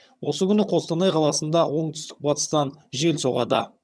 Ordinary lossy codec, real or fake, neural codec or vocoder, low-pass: none; fake; vocoder, 22.05 kHz, 80 mel bands, HiFi-GAN; none